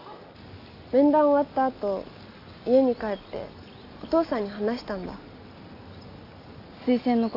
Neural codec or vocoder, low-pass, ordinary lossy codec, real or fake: none; 5.4 kHz; AAC, 32 kbps; real